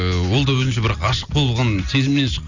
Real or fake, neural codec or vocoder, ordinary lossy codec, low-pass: real; none; none; 7.2 kHz